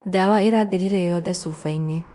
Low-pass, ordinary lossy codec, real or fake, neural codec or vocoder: 10.8 kHz; Opus, 64 kbps; fake; codec, 16 kHz in and 24 kHz out, 0.9 kbps, LongCat-Audio-Codec, fine tuned four codebook decoder